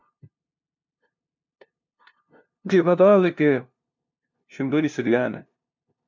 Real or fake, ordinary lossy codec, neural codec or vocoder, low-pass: fake; MP3, 64 kbps; codec, 16 kHz, 0.5 kbps, FunCodec, trained on LibriTTS, 25 frames a second; 7.2 kHz